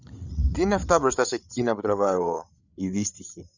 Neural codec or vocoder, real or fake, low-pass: codec, 16 kHz, 8 kbps, FreqCodec, larger model; fake; 7.2 kHz